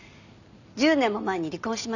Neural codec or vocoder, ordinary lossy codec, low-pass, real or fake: none; none; 7.2 kHz; real